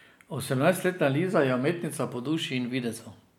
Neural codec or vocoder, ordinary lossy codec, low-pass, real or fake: none; none; none; real